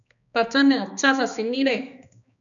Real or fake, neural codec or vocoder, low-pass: fake; codec, 16 kHz, 2 kbps, X-Codec, HuBERT features, trained on balanced general audio; 7.2 kHz